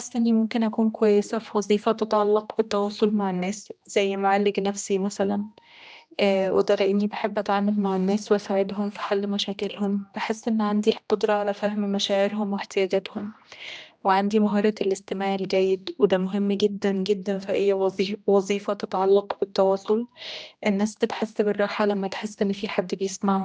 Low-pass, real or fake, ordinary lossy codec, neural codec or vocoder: none; fake; none; codec, 16 kHz, 1 kbps, X-Codec, HuBERT features, trained on general audio